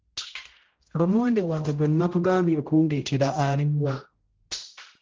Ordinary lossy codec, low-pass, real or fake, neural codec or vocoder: Opus, 16 kbps; 7.2 kHz; fake; codec, 16 kHz, 0.5 kbps, X-Codec, HuBERT features, trained on general audio